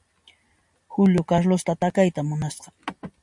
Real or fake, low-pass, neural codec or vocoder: real; 10.8 kHz; none